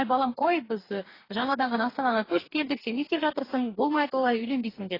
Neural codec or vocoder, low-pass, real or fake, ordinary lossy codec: codec, 44.1 kHz, 2.6 kbps, DAC; 5.4 kHz; fake; AAC, 24 kbps